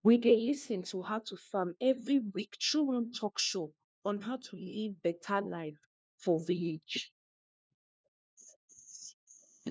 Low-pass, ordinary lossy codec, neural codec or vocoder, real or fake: none; none; codec, 16 kHz, 1 kbps, FunCodec, trained on LibriTTS, 50 frames a second; fake